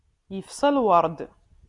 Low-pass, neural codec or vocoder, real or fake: 10.8 kHz; none; real